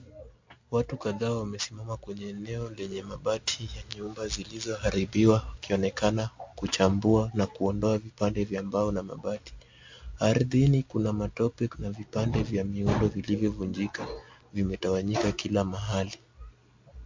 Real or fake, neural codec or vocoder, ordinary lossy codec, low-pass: real; none; MP3, 48 kbps; 7.2 kHz